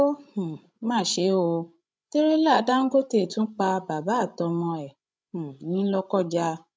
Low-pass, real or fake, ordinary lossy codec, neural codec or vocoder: none; fake; none; codec, 16 kHz, 16 kbps, FreqCodec, larger model